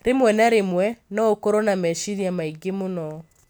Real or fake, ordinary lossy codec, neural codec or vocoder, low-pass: real; none; none; none